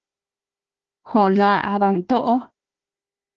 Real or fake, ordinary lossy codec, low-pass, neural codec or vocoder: fake; Opus, 16 kbps; 7.2 kHz; codec, 16 kHz, 1 kbps, FunCodec, trained on Chinese and English, 50 frames a second